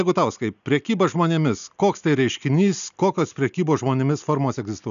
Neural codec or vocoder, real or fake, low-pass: none; real; 7.2 kHz